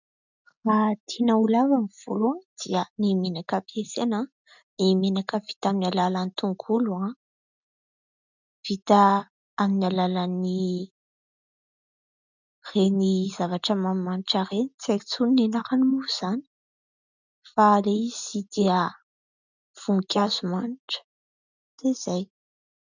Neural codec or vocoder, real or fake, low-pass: none; real; 7.2 kHz